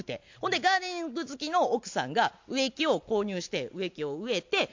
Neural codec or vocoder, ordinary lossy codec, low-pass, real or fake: none; MP3, 48 kbps; 7.2 kHz; real